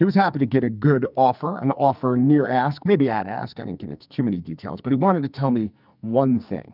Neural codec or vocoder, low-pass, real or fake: codec, 44.1 kHz, 2.6 kbps, SNAC; 5.4 kHz; fake